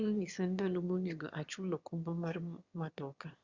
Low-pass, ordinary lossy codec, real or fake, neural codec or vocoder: 7.2 kHz; Opus, 64 kbps; fake; autoencoder, 22.05 kHz, a latent of 192 numbers a frame, VITS, trained on one speaker